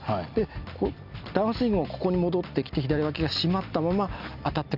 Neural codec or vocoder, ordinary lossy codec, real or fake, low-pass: none; AAC, 48 kbps; real; 5.4 kHz